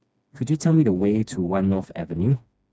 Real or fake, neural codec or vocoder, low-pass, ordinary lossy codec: fake; codec, 16 kHz, 2 kbps, FreqCodec, smaller model; none; none